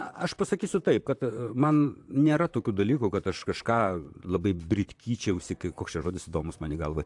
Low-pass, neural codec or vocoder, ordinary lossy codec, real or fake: 10.8 kHz; vocoder, 44.1 kHz, 128 mel bands, Pupu-Vocoder; AAC, 64 kbps; fake